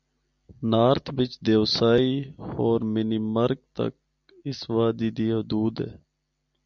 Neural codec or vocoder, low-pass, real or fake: none; 7.2 kHz; real